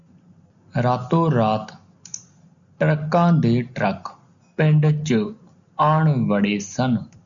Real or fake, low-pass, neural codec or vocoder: real; 7.2 kHz; none